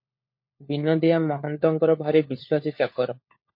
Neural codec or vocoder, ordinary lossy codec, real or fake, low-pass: codec, 16 kHz, 4 kbps, FunCodec, trained on LibriTTS, 50 frames a second; MP3, 32 kbps; fake; 5.4 kHz